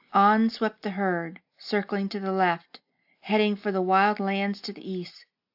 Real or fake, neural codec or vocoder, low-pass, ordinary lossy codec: real; none; 5.4 kHz; AAC, 48 kbps